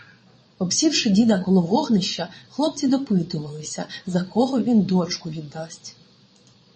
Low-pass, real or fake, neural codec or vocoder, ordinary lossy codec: 9.9 kHz; fake; vocoder, 22.05 kHz, 80 mel bands, Vocos; MP3, 32 kbps